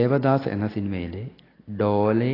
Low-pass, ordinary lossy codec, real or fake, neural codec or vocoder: 5.4 kHz; AAC, 24 kbps; real; none